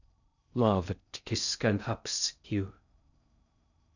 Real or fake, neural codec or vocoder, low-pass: fake; codec, 16 kHz in and 24 kHz out, 0.6 kbps, FocalCodec, streaming, 4096 codes; 7.2 kHz